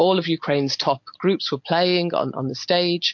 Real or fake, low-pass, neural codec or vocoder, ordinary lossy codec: real; 7.2 kHz; none; MP3, 48 kbps